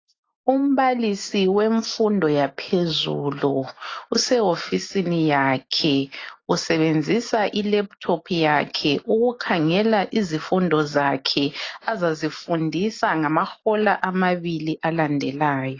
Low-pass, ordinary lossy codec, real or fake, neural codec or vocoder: 7.2 kHz; AAC, 32 kbps; real; none